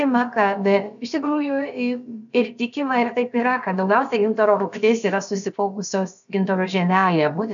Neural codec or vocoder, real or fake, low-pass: codec, 16 kHz, about 1 kbps, DyCAST, with the encoder's durations; fake; 7.2 kHz